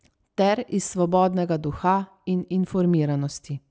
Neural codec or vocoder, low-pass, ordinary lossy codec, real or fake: none; none; none; real